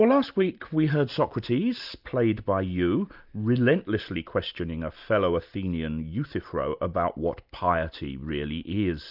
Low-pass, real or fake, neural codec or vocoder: 5.4 kHz; real; none